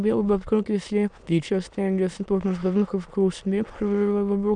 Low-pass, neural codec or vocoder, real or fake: 9.9 kHz; autoencoder, 22.05 kHz, a latent of 192 numbers a frame, VITS, trained on many speakers; fake